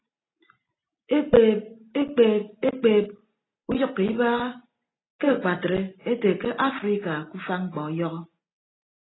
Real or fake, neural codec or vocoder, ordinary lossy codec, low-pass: real; none; AAC, 16 kbps; 7.2 kHz